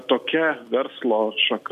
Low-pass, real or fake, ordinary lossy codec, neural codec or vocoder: 14.4 kHz; real; MP3, 96 kbps; none